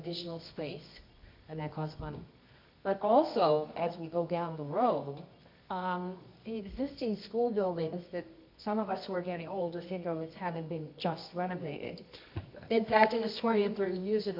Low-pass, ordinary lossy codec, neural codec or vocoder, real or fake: 5.4 kHz; MP3, 48 kbps; codec, 24 kHz, 0.9 kbps, WavTokenizer, medium music audio release; fake